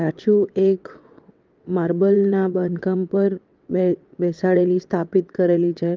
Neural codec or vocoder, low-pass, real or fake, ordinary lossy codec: vocoder, 22.05 kHz, 80 mel bands, WaveNeXt; 7.2 kHz; fake; Opus, 24 kbps